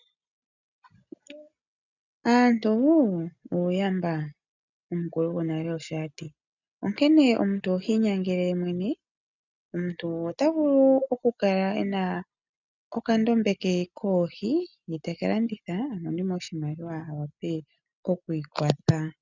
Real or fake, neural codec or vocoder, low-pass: real; none; 7.2 kHz